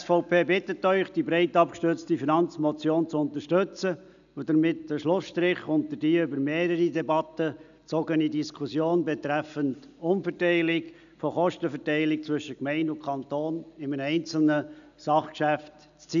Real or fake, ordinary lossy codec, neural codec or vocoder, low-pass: real; none; none; 7.2 kHz